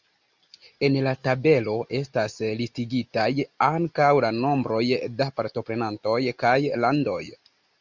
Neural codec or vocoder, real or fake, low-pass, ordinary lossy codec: none; real; 7.2 kHz; Opus, 64 kbps